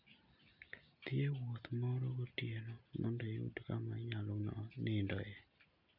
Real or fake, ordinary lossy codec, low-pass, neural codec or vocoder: real; none; 5.4 kHz; none